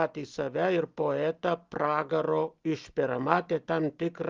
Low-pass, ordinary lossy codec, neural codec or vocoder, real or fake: 7.2 kHz; Opus, 32 kbps; none; real